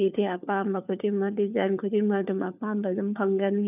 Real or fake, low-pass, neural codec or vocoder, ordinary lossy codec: fake; 3.6 kHz; codec, 16 kHz, 4 kbps, FunCodec, trained on LibriTTS, 50 frames a second; none